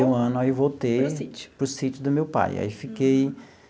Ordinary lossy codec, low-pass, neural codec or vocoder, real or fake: none; none; none; real